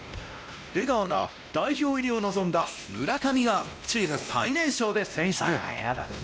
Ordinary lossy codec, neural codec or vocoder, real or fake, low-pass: none; codec, 16 kHz, 1 kbps, X-Codec, WavLM features, trained on Multilingual LibriSpeech; fake; none